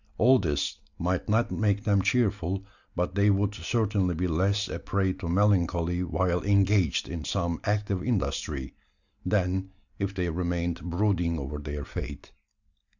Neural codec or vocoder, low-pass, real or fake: none; 7.2 kHz; real